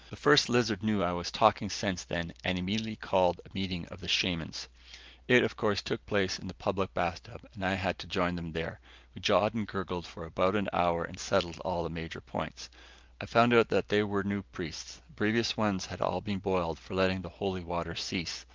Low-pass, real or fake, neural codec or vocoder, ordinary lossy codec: 7.2 kHz; real; none; Opus, 24 kbps